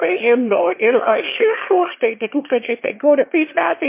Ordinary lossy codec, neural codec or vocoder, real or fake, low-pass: MP3, 24 kbps; autoencoder, 22.05 kHz, a latent of 192 numbers a frame, VITS, trained on one speaker; fake; 3.6 kHz